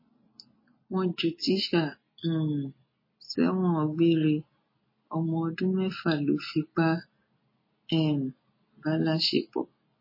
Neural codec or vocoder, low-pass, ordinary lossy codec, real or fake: none; 5.4 kHz; MP3, 24 kbps; real